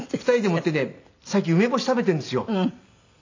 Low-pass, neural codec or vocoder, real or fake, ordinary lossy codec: 7.2 kHz; none; real; AAC, 48 kbps